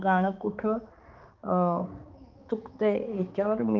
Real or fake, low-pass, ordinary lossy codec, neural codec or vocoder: fake; 7.2 kHz; Opus, 24 kbps; codec, 16 kHz, 4 kbps, X-Codec, HuBERT features, trained on balanced general audio